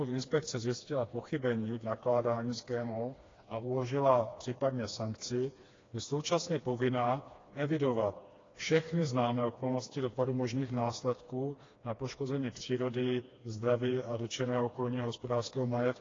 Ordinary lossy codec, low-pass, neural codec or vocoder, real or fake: AAC, 32 kbps; 7.2 kHz; codec, 16 kHz, 2 kbps, FreqCodec, smaller model; fake